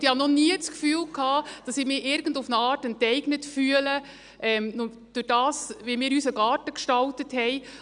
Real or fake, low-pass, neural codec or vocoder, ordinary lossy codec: real; 9.9 kHz; none; none